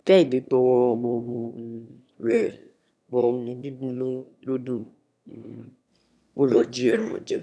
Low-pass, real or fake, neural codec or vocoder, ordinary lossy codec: none; fake; autoencoder, 22.05 kHz, a latent of 192 numbers a frame, VITS, trained on one speaker; none